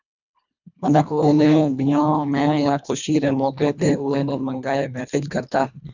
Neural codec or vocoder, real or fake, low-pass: codec, 24 kHz, 1.5 kbps, HILCodec; fake; 7.2 kHz